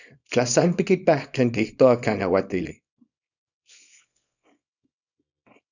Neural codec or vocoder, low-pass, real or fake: codec, 24 kHz, 0.9 kbps, WavTokenizer, small release; 7.2 kHz; fake